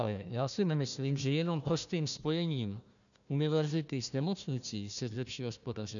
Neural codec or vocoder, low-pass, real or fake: codec, 16 kHz, 1 kbps, FunCodec, trained on Chinese and English, 50 frames a second; 7.2 kHz; fake